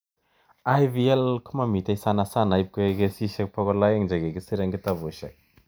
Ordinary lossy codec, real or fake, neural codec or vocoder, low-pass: none; real; none; none